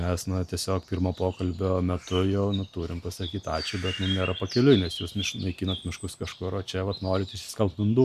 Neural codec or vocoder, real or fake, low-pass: none; real; 14.4 kHz